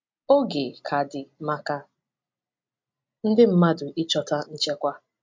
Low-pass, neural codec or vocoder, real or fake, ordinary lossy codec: 7.2 kHz; none; real; MP3, 48 kbps